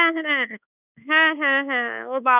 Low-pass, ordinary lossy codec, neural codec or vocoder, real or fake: 3.6 kHz; none; codec, 24 kHz, 1.2 kbps, DualCodec; fake